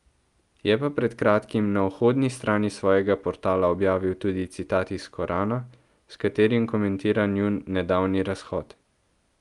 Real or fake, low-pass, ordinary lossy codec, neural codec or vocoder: real; 10.8 kHz; Opus, 32 kbps; none